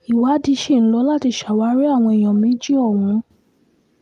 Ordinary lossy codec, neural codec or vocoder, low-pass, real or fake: Opus, 32 kbps; none; 14.4 kHz; real